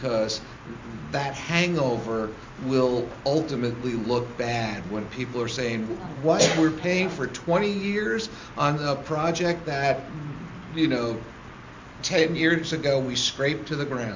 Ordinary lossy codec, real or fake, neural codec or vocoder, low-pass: MP3, 48 kbps; real; none; 7.2 kHz